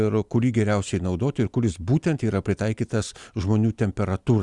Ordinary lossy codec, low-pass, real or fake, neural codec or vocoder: Opus, 64 kbps; 10.8 kHz; real; none